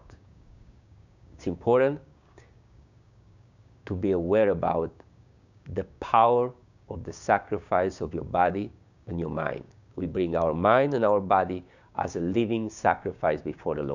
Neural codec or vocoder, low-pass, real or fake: codec, 16 kHz, 6 kbps, DAC; 7.2 kHz; fake